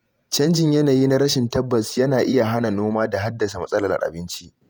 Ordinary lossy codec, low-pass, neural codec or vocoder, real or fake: none; none; vocoder, 48 kHz, 128 mel bands, Vocos; fake